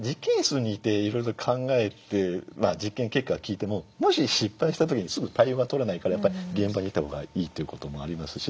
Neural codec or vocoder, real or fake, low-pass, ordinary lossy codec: none; real; none; none